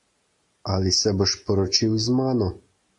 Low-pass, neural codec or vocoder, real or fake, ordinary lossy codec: 10.8 kHz; none; real; AAC, 64 kbps